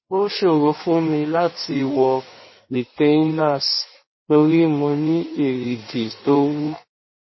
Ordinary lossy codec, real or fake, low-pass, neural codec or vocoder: MP3, 24 kbps; fake; 7.2 kHz; codec, 16 kHz in and 24 kHz out, 1.1 kbps, FireRedTTS-2 codec